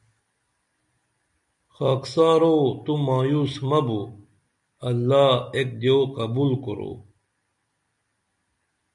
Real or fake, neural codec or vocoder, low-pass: real; none; 10.8 kHz